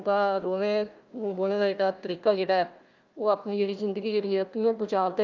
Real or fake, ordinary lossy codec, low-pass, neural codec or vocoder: fake; Opus, 24 kbps; 7.2 kHz; codec, 16 kHz, 1 kbps, FunCodec, trained on Chinese and English, 50 frames a second